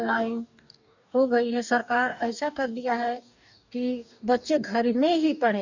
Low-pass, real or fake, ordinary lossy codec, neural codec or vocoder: 7.2 kHz; fake; none; codec, 44.1 kHz, 2.6 kbps, DAC